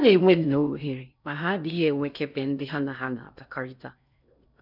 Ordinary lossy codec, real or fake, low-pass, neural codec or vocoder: none; fake; 5.4 kHz; codec, 16 kHz in and 24 kHz out, 0.6 kbps, FocalCodec, streaming, 4096 codes